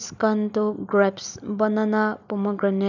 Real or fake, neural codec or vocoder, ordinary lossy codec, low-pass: real; none; none; 7.2 kHz